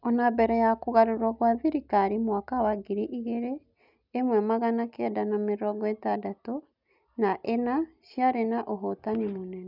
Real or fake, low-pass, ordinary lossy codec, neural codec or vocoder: real; 5.4 kHz; none; none